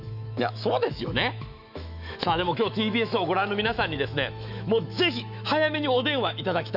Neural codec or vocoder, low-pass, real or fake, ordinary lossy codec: autoencoder, 48 kHz, 128 numbers a frame, DAC-VAE, trained on Japanese speech; 5.4 kHz; fake; none